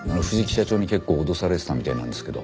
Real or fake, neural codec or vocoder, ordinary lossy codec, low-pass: real; none; none; none